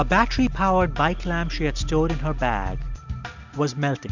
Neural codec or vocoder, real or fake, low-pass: none; real; 7.2 kHz